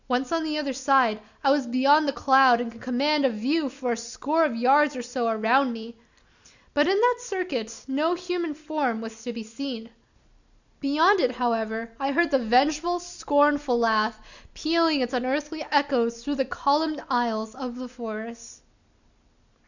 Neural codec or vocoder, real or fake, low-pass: none; real; 7.2 kHz